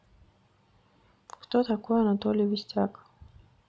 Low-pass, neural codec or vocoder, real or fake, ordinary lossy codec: none; none; real; none